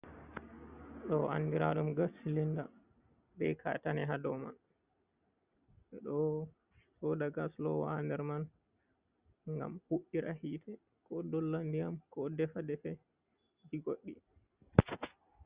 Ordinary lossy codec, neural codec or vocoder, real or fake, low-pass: Opus, 64 kbps; none; real; 3.6 kHz